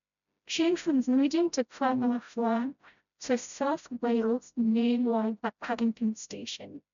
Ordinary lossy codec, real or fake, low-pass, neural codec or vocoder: none; fake; 7.2 kHz; codec, 16 kHz, 0.5 kbps, FreqCodec, smaller model